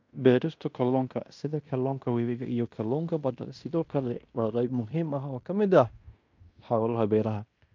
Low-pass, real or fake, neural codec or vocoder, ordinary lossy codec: 7.2 kHz; fake; codec, 16 kHz in and 24 kHz out, 0.9 kbps, LongCat-Audio-Codec, fine tuned four codebook decoder; MP3, 64 kbps